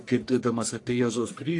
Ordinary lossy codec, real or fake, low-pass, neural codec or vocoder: AAC, 48 kbps; fake; 10.8 kHz; codec, 44.1 kHz, 1.7 kbps, Pupu-Codec